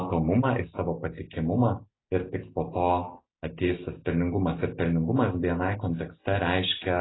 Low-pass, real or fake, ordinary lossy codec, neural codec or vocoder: 7.2 kHz; real; AAC, 16 kbps; none